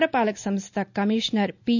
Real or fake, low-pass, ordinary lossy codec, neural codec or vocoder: real; 7.2 kHz; none; none